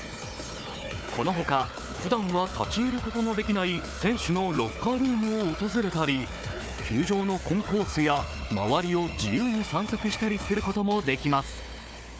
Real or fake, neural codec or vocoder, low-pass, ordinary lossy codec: fake; codec, 16 kHz, 4 kbps, FunCodec, trained on Chinese and English, 50 frames a second; none; none